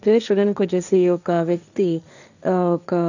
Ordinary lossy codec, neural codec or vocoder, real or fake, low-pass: none; codec, 16 kHz, 1.1 kbps, Voila-Tokenizer; fake; 7.2 kHz